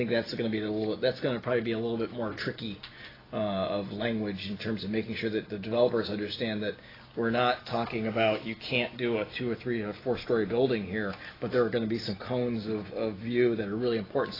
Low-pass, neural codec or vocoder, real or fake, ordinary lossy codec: 5.4 kHz; none; real; AAC, 24 kbps